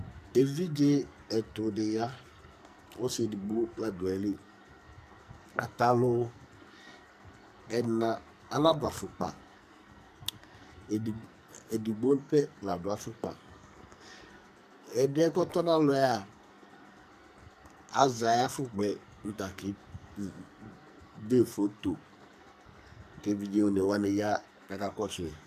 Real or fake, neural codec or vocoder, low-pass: fake; codec, 44.1 kHz, 2.6 kbps, SNAC; 14.4 kHz